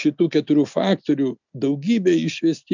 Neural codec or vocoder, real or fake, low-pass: none; real; 7.2 kHz